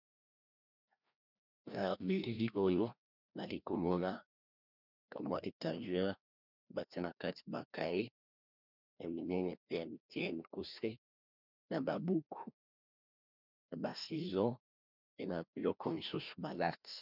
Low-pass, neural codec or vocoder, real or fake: 5.4 kHz; codec, 16 kHz, 1 kbps, FreqCodec, larger model; fake